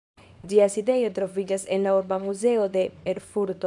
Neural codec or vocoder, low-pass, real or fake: codec, 24 kHz, 0.9 kbps, WavTokenizer, small release; 10.8 kHz; fake